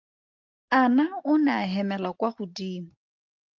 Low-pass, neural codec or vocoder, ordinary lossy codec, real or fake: 7.2 kHz; none; Opus, 24 kbps; real